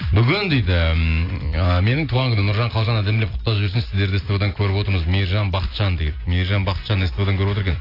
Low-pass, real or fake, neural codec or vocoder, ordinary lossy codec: 5.4 kHz; real; none; AAC, 32 kbps